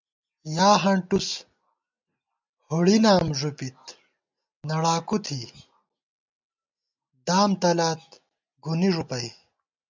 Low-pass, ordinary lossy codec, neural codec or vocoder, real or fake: 7.2 kHz; MP3, 64 kbps; none; real